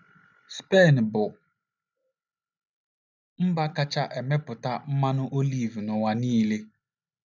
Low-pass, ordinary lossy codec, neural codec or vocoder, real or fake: 7.2 kHz; none; none; real